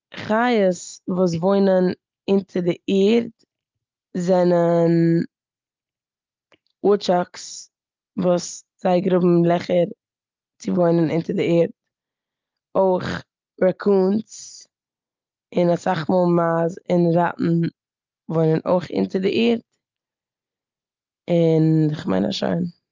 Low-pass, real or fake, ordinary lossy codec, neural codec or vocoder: 7.2 kHz; real; Opus, 32 kbps; none